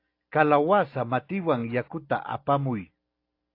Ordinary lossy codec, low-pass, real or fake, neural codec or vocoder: AAC, 32 kbps; 5.4 kHz; real; none